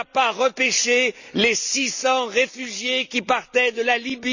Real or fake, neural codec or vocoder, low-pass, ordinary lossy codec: real; none; 7.2 kHz; none